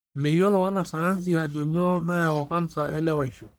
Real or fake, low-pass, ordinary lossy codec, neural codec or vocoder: fake; none; none; codec, 44.1 kHz, 1.7 kbps, Pupu-Codec